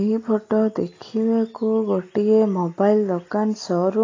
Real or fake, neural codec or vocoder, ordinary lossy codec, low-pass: real; none; AAC, 32 kbps; 7.2 kHz